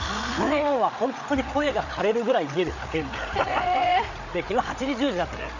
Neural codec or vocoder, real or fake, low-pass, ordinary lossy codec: codec, 16 kHz, 8 kbps, FreqCodec, larger model; fake; 7.2 kHz; none